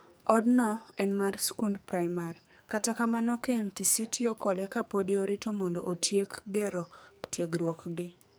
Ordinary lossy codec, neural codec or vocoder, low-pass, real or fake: none; codec, 44.1 kHz, 2.6 kbps, SNAC; none; fake